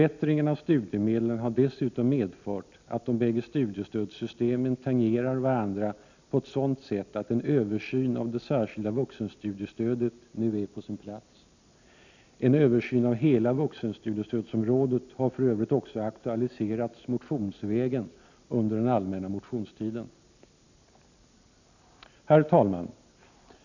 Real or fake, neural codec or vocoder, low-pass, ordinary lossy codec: real; none; 7.2 kHz; none